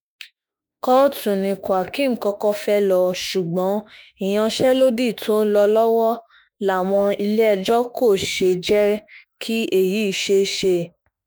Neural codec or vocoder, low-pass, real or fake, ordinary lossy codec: autoencoder, 48 kHz, 32 numbers a frame, DAC-VAE, trained on Japanese speech; none; fake; none